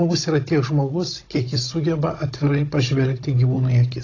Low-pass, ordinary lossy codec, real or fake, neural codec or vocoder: 7.2 kHz; AAC, 32 kbps; fake; codec, 16 kHz, 16 kbps, FunCodec, trained on Chinese and English, 50 frames a second